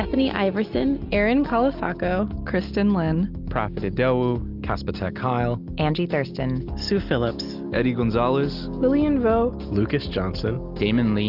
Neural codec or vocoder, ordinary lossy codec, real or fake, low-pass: none; Opus, 24 kbps; real; 5.4 kHz